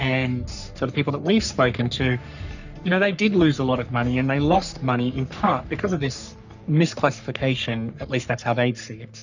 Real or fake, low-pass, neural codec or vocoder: fake; 7.2 kHz; codec, 44.1 kHz, 3.4 kbps, Pupu-Codec